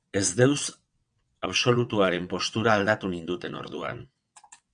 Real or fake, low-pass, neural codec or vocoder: fake; 9.9 kHz; vocoder, 22.05 kHz, 80 mel bands, WaveNeXt